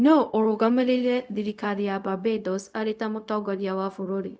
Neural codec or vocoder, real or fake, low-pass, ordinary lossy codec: codec, 16 kHz, 0.4 kbps, LongCat-Audio-Codec; fake; none; none